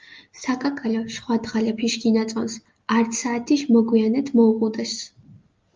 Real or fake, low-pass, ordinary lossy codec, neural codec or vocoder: real; 7.2 kHz; Opus, 24 kbps; none